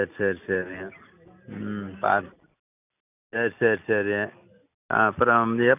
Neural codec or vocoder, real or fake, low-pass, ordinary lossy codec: none; real; 3.6 kHz; none